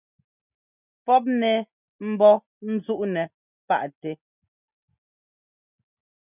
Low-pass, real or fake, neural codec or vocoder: 3.6 kHz; real; none